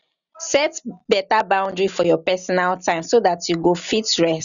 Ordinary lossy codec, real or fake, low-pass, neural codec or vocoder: none; real; 7.2 kHz; none